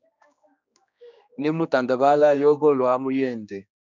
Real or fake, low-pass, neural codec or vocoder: fake; 7.2 kHz; codec, 16 kHz, 2 kbps, X-Codec, HuBERT features, trained on general audio